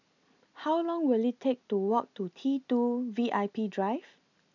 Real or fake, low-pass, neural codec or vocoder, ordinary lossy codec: real; 7.2 kHz; none; none